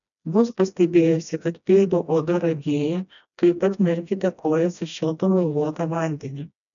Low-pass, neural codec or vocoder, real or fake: 7.2 kHz; codec, 16 kHz, 1 kbps, FreqCodec, smaller model; fake